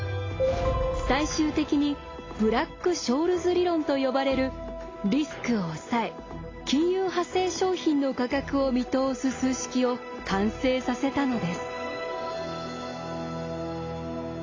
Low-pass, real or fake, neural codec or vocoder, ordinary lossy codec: 7.2 kHz; real; none; AAC, 32 kbps